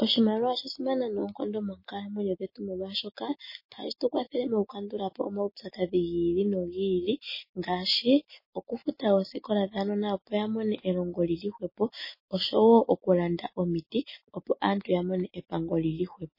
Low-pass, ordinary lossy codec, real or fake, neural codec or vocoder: 5.4 kHz; MP3, 24 kbps; real; none